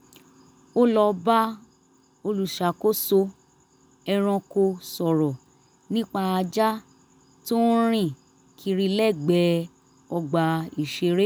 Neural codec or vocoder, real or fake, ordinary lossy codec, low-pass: none; real; none; none